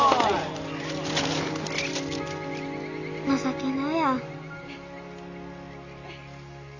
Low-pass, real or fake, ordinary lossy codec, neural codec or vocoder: 7.2 kHz; real; none; none